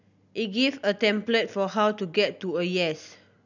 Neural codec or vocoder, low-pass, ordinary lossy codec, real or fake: none; 7.2 kHz; none; real